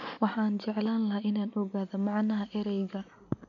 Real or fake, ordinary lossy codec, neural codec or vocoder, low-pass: real; none; none; 7.2 kHz